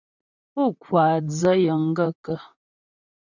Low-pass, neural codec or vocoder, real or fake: 7.2 kHz; vocoder, 44.1 kHz, 128 mel bands, Pupu-Vocoder; fake